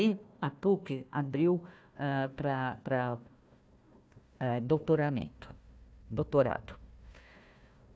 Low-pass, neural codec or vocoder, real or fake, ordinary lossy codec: none; codec, 16 kHz, 1 kbps, FunCodec, trained on Chinese and English, 50 frames a second; fake; none